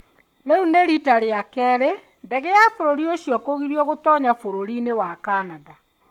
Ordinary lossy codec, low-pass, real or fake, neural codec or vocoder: none; 19.8 kHz; fake; codec, 44.1 kHz, 7.8 kbps, Pupu-Codec